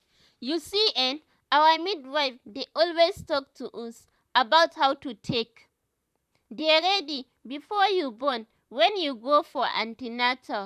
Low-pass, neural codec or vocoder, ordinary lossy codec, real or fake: 14.4 kHz; vocoder, 44.1 kHz, 128 mel bands, Pupu-Vocoder; none; fake